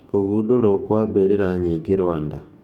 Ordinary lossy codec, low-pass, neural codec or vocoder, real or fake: none; 19.8 kHz; codec, 44.1 kHz, 2.6 kbps, DAC; fake